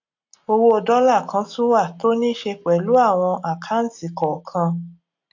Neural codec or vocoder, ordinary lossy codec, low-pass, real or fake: none; AAC, 48 kbps; 7.2 kHz; real